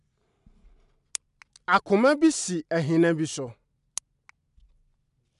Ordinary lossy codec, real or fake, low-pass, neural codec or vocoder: MP3, 96 kbps; real; 10.8 kHz; none